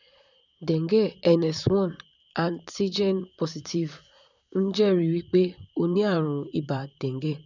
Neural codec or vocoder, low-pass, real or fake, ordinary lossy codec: vocoder, 44.1 kHz, 128 mel bands, Pupu-Vocoder; 7.2 kHz; fake; none